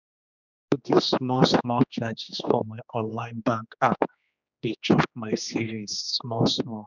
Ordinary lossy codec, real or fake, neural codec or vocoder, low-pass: none; fake; codec, 16 kHz, 2 kbps, X-Codec, HuBERT features, trained on general audio; 7.2 kHz